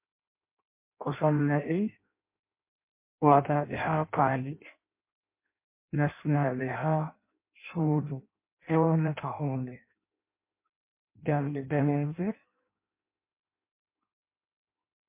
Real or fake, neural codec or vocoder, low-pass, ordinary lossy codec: fake; codec, 16 kHz in and 24 kHz out, 0.6 kbps, FireRedTTS-2 codec; 3.6 kHz; MP3, 24 kbps